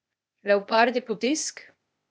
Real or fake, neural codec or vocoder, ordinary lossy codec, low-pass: fake; codec, 16 kHz, 0.8 kbps, ZipCodec; none; none